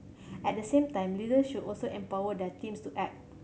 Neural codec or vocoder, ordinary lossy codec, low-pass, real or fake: none; none; none; real